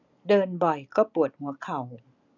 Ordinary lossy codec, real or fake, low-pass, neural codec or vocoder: none; real; 7.2 kHz; none